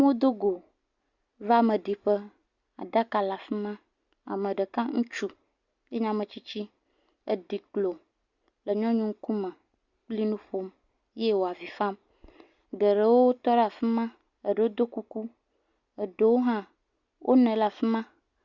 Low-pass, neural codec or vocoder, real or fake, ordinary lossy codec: 7.2 kHz; none; real; Opus, 64 kbps